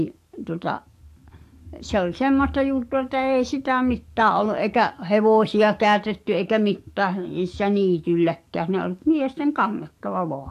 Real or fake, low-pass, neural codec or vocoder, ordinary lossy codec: fake; 14.4 kHz; codec, 44.1 kHz, 7.8 kbps, DAC; AAC, 64 kbps